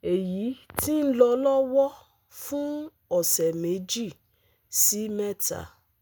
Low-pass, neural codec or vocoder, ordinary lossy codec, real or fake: none; none; none; real